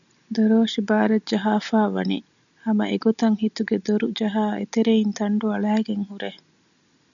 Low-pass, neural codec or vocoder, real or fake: 7.2 kHz; none; real